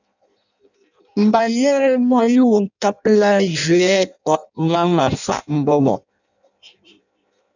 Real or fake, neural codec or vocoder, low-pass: fake; codec, 16 kHz in and 24 kHz out, 0.6 kbps, FireRedTTS-2 codec; 7.2 kHz